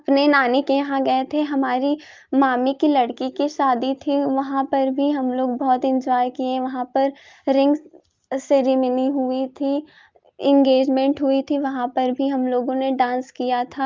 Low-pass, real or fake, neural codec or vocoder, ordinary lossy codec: 7.2 kHz; real; none; Opus, 32 kbps